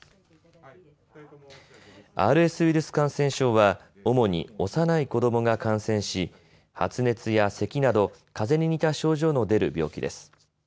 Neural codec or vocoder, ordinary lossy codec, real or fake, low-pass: none; none; real; none